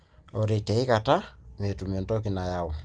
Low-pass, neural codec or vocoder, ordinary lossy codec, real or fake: 9.9 kHz; none; Opus, 32 kbps; real